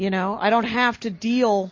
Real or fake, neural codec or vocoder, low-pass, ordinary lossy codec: real; none; 7.2 kHz; MP3, 32 kbps